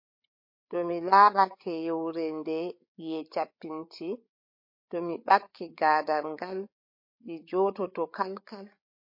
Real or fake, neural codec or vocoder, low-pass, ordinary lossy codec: fake; codec, 16 kHz, 8 kbps, FreqCodec, larger model; 5.4 kHz; MP3, 32 kbps